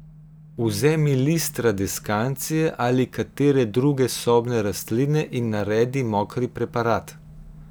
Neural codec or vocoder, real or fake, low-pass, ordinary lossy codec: none; real; none; none